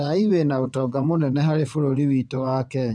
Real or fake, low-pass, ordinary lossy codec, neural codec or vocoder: fake; 9.9 kHz; none; vocoder, 22.05 kHz, 80 mel bands, WaveNeXt